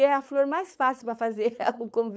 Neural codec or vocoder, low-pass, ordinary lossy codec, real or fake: codec, 16 kHz, 4.8 kbps, FACodec; none; none; fake